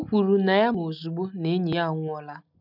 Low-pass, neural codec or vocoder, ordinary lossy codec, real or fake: 5.4 kHz; none; none; real